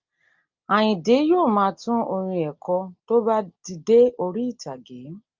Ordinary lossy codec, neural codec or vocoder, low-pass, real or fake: Opus, 32 kbps; none; 7.2 kHz; real